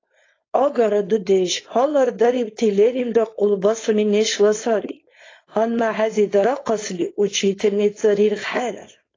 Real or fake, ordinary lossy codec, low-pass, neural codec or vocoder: fake; AAC, 32 kbps; 7.2 kHz; codec, 16 kHz, 4.8 kbps, FACodec